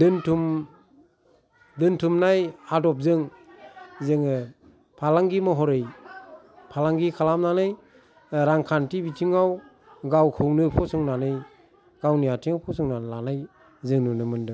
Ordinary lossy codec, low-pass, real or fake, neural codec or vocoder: none; none; real; none